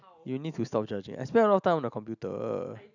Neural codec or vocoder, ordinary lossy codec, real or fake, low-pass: none; none; real; 7.2 kHz